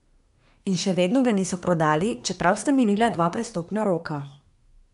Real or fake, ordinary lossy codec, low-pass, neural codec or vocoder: fake; none; 10.8 kHz; codec, 24 kHz, 1 kbps, SNAC